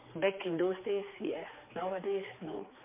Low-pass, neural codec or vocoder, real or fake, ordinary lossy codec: 3.6 kHz; codec, 16 kHz, 4 kbps, X-Codec, HuBERT features, trained on general audio; fake; MP3, 24 kbps